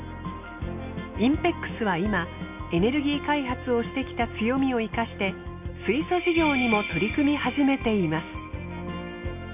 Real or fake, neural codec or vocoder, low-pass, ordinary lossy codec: real; none; 3.6 kHz; none